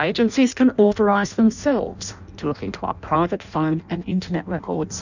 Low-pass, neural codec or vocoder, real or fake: 7.2 kHz; codec, 16 kHz in and 24 kHz out, 0.6 kbps, FireRedTTS-2 codec; fake